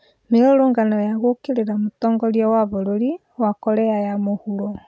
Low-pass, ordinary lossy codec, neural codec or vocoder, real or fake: none; none; none; real